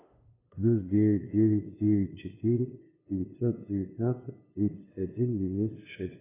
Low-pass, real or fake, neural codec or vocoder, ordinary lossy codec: 3.6 kHz; fake; codec, 16 kHz, 2 kbps, FunCodec, trained on LibriTTS, 25 frames a second; AAC, 16 kbps